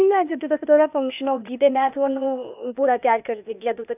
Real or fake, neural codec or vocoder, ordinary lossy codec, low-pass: fake; codec, 16 kHz, 0.8 kbps, ZipCodec; none; 3.6 kHz